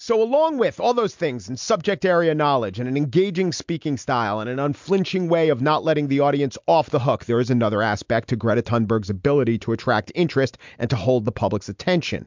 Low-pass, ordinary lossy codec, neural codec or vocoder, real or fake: 7.2 kHz; MP3, 64 kbps; none; real